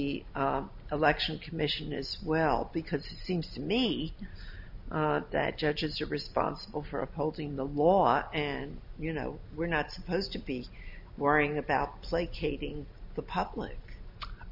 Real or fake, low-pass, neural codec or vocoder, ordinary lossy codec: real; 5.4 kHz; none; MP3, 48 kbps